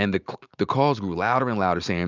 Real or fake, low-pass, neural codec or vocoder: real; 7.2 kHz; none